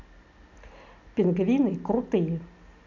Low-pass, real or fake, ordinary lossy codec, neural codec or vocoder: 7.2 kHz; real; none; none